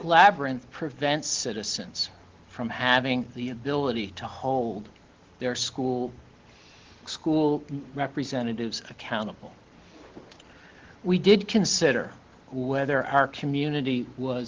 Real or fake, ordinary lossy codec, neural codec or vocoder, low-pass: real; Opus, 16 kbps; none; 7.2 kHz